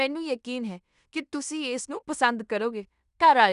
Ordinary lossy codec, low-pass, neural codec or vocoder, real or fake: none; 10.8 kHz; codec, 16 kHz in and 24 kHz out, 0.9 kbps, LongCat-Audio-Codec, fine tuned four codebook decoder; fake